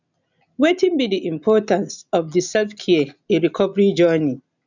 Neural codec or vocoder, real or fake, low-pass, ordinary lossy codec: none; real; 7.2 kHz; none